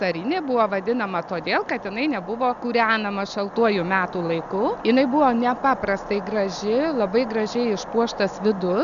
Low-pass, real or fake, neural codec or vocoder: 7.2 kHz; real; none